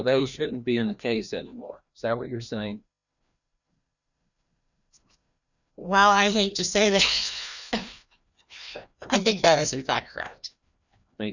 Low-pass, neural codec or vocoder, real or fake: 7.2 kHz; codec, 16 kHz, 1 kbps, FreqCodec, larger model; fake